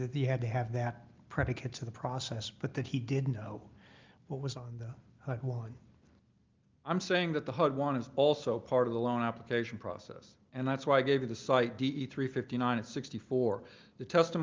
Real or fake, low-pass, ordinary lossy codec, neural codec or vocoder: real; 7.2 kHz; Opus, 32 kbps; none